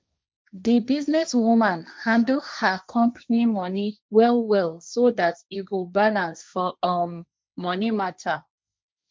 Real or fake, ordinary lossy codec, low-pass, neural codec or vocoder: fake; none; none; codec, 16 kHz, 1.1 kbps, Voila-Tokenizer